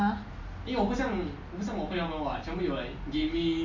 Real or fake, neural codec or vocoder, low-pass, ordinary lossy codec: real; none; 7.2 kHz; MP3, 48 kbps